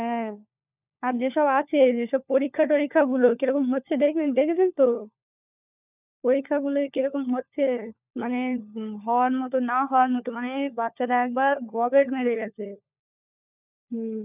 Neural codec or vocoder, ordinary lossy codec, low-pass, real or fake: codec, 16 kHz, 4 kbps, FunCodec, trained on LibriTTS, 50 frames a second; none; 3.6 kHz; fake